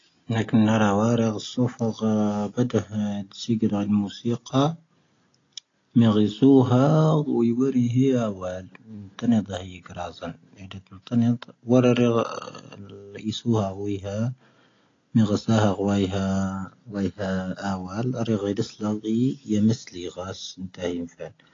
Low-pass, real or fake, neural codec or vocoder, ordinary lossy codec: 7.2 kHz; real; none; AAC, 32 kbps